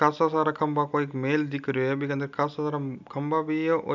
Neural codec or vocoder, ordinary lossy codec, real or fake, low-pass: none; none; real; 7.2 kHz